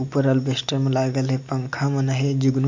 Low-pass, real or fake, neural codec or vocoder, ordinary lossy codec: 7.2 kHz; real; none; MP3, 48 kbps